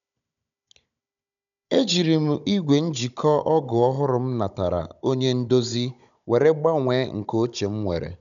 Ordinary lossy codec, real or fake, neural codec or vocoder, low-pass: none; fake; codec, 16 kHz, 16 kbps, FunCodec, trained on Chinese and English, 50 frames a second; 7.2 kHz